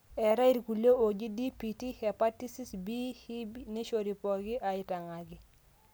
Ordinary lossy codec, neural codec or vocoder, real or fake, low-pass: none; none; real; none